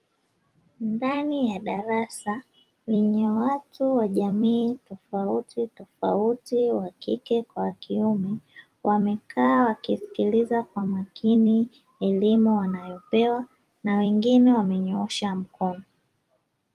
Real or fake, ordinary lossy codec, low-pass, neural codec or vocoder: fake; Opus, 32 kbps; 14.4 kHz; vocoder, 44.1 kHz, 128 mel bands every 256 samples, BigVGAN v2